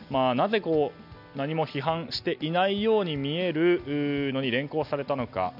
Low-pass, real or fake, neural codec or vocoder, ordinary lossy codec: 5.4 kHz; real; none; none